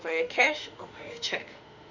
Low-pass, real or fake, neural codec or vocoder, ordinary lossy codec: 7.2 kHz; fake; autoencoder, 48 kHz, 32 numbers a frame, DAC-VAE, trained on Japanese speech; none